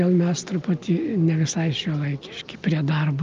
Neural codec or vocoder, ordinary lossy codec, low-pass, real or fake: none; Opus, 24 kbps; 7.2 kHz; real